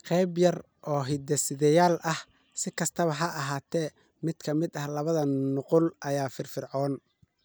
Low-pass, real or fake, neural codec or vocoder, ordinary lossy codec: none; real; none; none